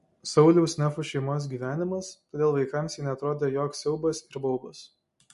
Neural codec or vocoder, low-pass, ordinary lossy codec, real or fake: none; 14.4 kHz; MP3, 48 kbps; real